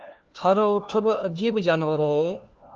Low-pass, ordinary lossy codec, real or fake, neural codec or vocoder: 7.2 kHz; Opus, 24 kbps; fake; codec, 16 kHz, 1 kbps, FunCodec, trained on LibriTTS, 50 frames a second